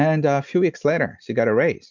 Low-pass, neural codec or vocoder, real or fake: 7.2 kHz; none; real